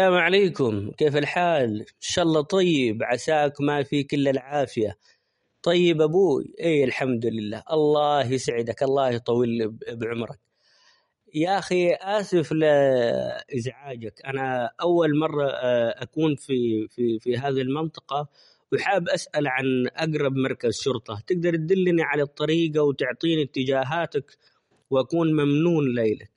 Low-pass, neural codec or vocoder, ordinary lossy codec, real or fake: 19.8 kHz; none; MP3, 48 kbps; real